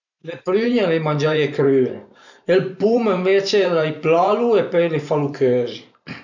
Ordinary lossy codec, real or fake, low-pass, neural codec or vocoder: none; fake; 7.2 kHz; vocoder, 44.1 kHz, 128 mel bands every 512 samples, BigVGAN v2